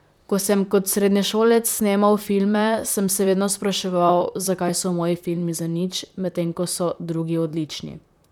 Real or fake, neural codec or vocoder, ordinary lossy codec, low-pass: fake; vocoder, 44.1 kHz, 128 mel bands, Pupu-Vocoder; none; 19.8 kHz